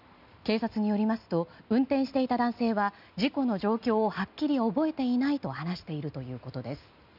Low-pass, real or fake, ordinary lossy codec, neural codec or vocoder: 5.4 kHz; real; none; none